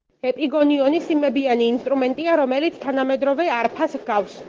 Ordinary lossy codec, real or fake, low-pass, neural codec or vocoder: Opus, 16 kbps; real; 7.2 kHz; none